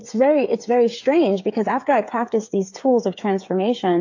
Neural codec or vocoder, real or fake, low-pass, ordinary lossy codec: codec, 16 kHz, 8 kbps, FreqCodec, smaller model; fake; 7.2 kHz; AAC, 48 kbps